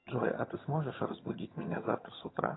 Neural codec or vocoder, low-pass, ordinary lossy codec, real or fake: vocoder, 22.05 kHz, 80 mel bands, HiFi-GAN; 7.2 kHz; AAC, 16 kbps; fake